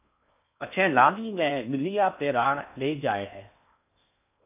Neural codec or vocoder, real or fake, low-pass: codec, 16 kHz in and 24 kHz out, 0.6 kbps, FocalCodec, streaming, 4096 codes; fake; 3.6 kHz